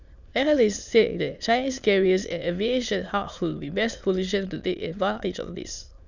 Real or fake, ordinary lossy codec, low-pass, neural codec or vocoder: fake; none; 7.2 kHz; autoencoder, 22.05 kHz, a latent of 192 numbers a frame, VITS, trained on many speakers